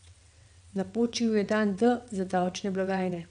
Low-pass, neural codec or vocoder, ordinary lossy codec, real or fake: 9.9 kHz; vocoder, 22.05 kHz, 80 mel bands, WaveNeXt; none; fake